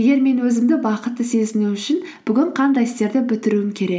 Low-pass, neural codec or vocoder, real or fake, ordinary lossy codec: none; none; real; none